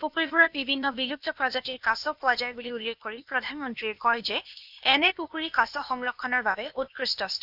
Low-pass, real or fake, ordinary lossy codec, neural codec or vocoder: 5.4 kHz; fake; none; codec, 16 kHz, 0.8 kbps, ZipCodec